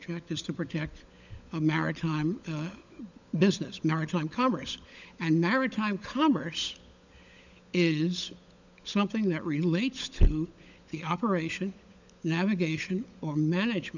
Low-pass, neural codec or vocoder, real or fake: 7.2 kHz; codec, 16 kHz, 16 kbps, FunCodec, trained on LibriTTS, 50 frames a second; fake